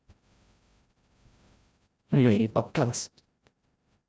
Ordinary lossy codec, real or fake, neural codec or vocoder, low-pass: none; fake; codec, 16 kHz, 0.5 kbps, FreqCodec, larger model; none